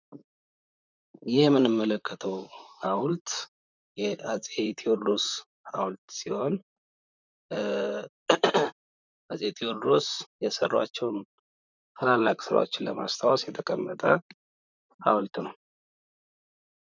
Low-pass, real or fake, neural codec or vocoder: 7.2 kHz; fake; vocoder, 44.1 kHz, 128 mel bands, Pupu-Vocoder